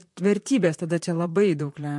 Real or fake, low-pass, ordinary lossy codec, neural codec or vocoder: fake; 10.8 kHz; MP3, 64 kbps; vocoder, 44.1 kHz, 128 mel bands, Pupu-Vocoder